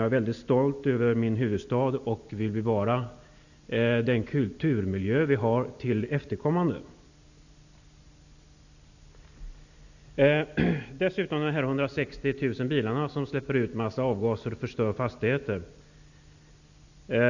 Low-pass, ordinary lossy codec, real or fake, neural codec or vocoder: 7.2 kHz; none; real; none